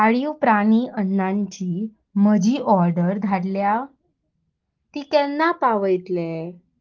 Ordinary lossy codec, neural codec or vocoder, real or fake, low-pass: Opus, 16 kbps; none; real; 7.2 kHz